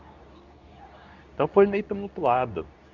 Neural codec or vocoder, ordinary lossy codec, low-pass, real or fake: codec, 24 kHz, 0.9 kbps, WavTokenizer, medium speech release version 2; none; 7.2 kHz; fake